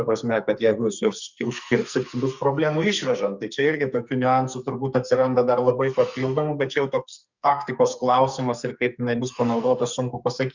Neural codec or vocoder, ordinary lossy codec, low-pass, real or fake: codec, 44.1 kHz, 2.6 kbps, SNAC; Opus, 64 kbps; 7.2 kHz; fake